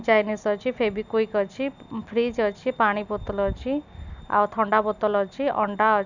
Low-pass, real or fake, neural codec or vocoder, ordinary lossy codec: 7.2 kHz; real; none; none